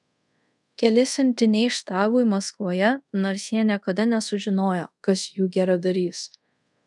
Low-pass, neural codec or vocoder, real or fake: 10.8 kHz; codec, 24 kHz, 0.5 kbps, DualCodec; fake